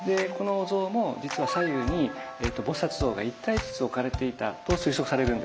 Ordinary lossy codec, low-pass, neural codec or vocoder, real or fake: none; none; none; real